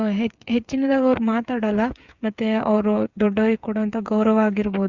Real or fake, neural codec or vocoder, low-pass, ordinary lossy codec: fake; codec, 16 kHz, 8 kbps, FreqCodec, smaller model; 7.2 kHz; Opus, 64 kbps